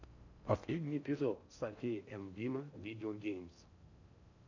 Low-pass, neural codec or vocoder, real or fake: 7.2 kHz; codec, 16 kHz in and 24 kHz out, 0.6 kbps, FocalCodec, streaming, 4096 codes; fake